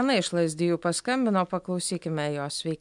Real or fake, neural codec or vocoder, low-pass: real; none; 10.8 kHz